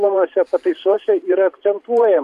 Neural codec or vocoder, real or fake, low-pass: vocoder, 44.1 kHz, 128 mel bands every 512 samples, BigVGAN v2; fake; 14.4 kHz